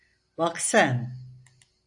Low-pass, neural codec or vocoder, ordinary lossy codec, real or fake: 10.8 kHz; none; AAC, 64 kbps; real